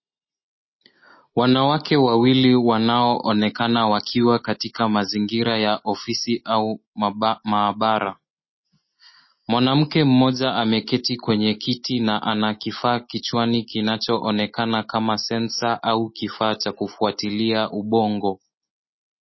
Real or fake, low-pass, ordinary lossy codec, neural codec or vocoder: real; 7.2 kHz; MP3, 24 kbps; none